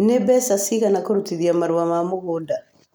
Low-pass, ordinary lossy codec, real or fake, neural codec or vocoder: none; none; real; none